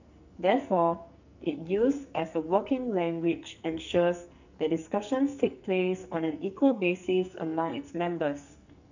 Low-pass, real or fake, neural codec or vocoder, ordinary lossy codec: 7.2 kHz; fake; codec, 44.1 kHz, 2.6 kbps, SNAC; none